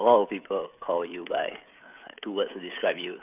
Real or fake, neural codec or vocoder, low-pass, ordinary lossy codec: fake; codec, 16 kHz, 16 kbps, FreqCodec, smaller model; 3.6 kHz; none